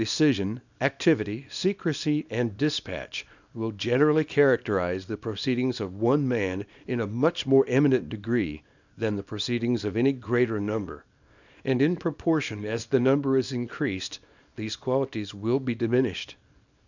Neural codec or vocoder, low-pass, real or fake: codec, 24 kHz, 0.9 kbps, WavTokenizer, small release; 7.2 kHz; fake